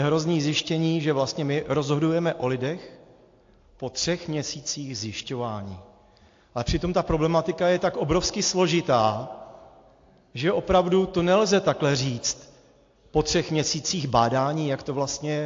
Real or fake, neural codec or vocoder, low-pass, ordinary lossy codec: real; none; 7.2 kHz; AAC, 48 kbps